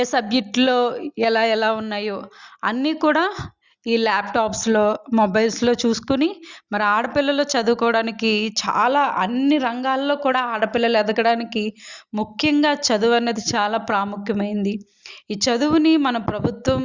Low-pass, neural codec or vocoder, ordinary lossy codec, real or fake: 7.2 kHz; none; Opus, 64 kbps; real